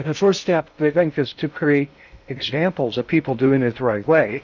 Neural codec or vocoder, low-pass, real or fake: codec, 16 kHz in and 24 kHz out, 0.6 kbps, FocalCodec, streaming, 2048 codes; 7.2 kHz; fake